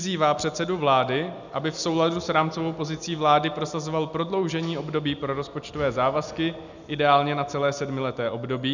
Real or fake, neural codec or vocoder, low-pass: real; none; 7.2 kHz